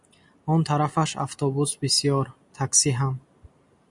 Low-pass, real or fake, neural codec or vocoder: 10.8 kHz; real; none